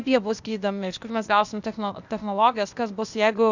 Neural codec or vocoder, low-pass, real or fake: codec, 16 kHz, 0.8 kbps, ZipCodec; 7.2 kHz; fake